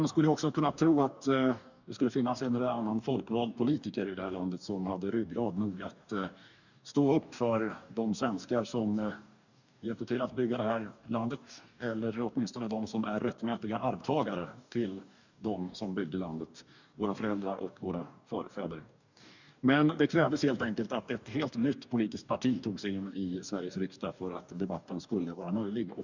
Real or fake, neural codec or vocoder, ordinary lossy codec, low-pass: fake; codec, 44.1 kHz, 2.6 kbps, DAC; none; 7.2 kHz